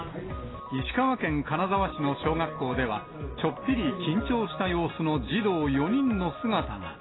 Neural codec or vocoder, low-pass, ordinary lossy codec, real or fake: none; 7.2 kHz; AAC, 16 kbps; real